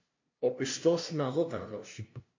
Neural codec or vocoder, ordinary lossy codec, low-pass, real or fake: codec, 16 kHz, 0.5 kbps, FunCodec, trained on LibriTTS, 25 frames a second; MP3, 48 kbps; 7.2 kHz; fake